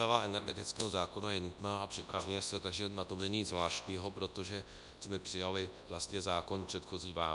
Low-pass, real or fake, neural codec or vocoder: 10.8 kHz; fake; codec, 24 kHz, 0.9 kbps, WavTokenizer, large speech release